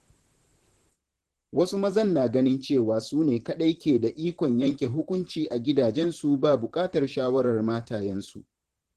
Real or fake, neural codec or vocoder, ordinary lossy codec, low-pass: fake; vocoder, 44.1 kHz, 128 mel bands, Pupu-Vocoder; Opus, 16 kbps; 14.4 kHz